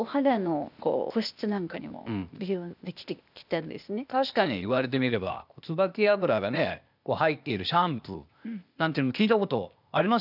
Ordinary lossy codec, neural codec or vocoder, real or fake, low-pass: none; codec, 16 kHz, 0.8 kbps, ZipCodec; fake; 5.4 kHz